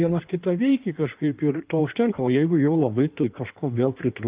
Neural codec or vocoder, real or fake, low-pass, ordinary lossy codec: codec, 16 kHz in and 24 kHz out, 1.1 kbps, FireRedTTS-2 codec; fake; 3.6 kHz; Opus, 32 kbps